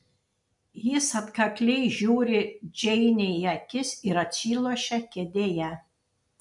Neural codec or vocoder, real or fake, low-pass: none; real; 10.8 kHz